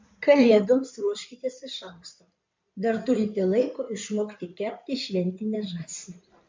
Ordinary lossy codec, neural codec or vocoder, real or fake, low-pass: MP3, 64 kbps; codec, 16 kHz in and 24 kHz out, 2.2 kbps, FireRedTTS-2 codec; fake; 7.2 kHz